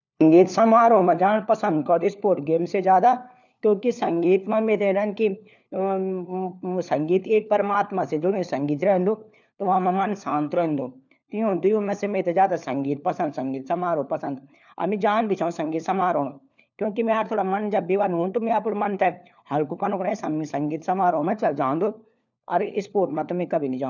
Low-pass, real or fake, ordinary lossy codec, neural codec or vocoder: 7.2 kHz; fake; none; codec, 16 kHz, 4 kbps, FunCodec, trained on LibriTTS, 50 frames a second